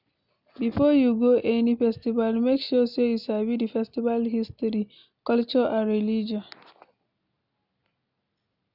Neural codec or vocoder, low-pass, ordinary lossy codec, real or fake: none; 5.4 kHz; none; real